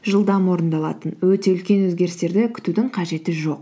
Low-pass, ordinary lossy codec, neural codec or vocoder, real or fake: none; none; none; real